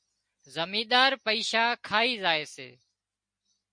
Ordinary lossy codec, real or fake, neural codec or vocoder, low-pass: MP3, 48 kbps; real; none; 9.9 kHz